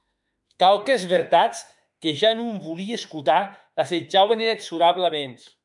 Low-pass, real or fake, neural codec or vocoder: 10.8 kHz; fake; autoencoder, 48 kHz, 32 numbers a frame, DAC-VAE, trained on Japanese speech